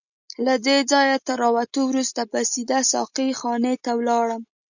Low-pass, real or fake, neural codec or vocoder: 7.2 kHz; real; none